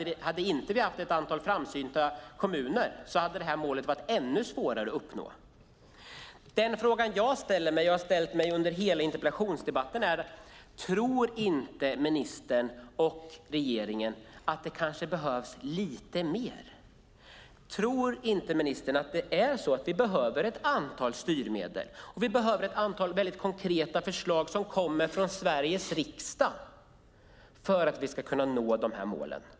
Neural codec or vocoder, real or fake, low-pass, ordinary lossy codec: none; real; none; none